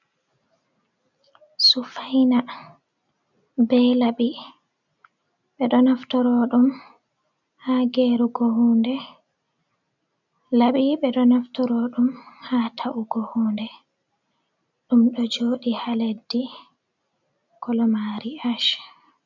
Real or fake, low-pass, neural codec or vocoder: real; 7.2 kHz; none